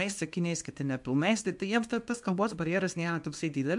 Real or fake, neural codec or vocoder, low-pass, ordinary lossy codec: fake; codec, 24 kHz, 0.9 kbps, WavTokenizer, small release; 10.8 kHz; MP3, 64 kbps